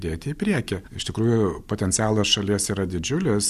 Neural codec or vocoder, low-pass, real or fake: none; 14.4 kHz; real